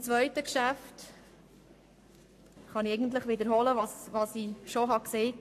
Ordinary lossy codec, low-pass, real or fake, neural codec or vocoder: AAC, 64 kbps; 14.4 kHz; fake; codec, 44.1 kHz, 7.8 kbps, Pupu-Codec